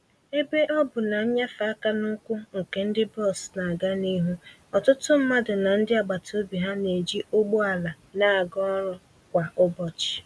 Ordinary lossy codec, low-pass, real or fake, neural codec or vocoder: none; none; real; none